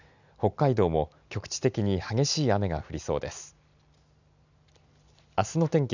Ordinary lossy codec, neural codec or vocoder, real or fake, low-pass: none; none; real; 7.2 kHz